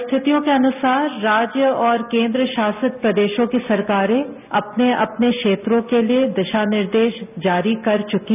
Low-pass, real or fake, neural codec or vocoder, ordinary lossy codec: 3.6 kHz; real; none; none